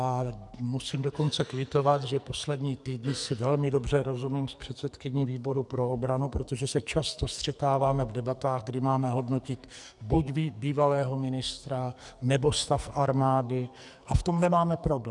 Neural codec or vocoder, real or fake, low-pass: codec, 44.1 kHz, 2.6 kbps, SNAC; fake; 10.8 kHz